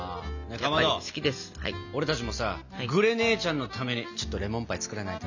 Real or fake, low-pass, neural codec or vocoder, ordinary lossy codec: real; 7.2 kHz; none; none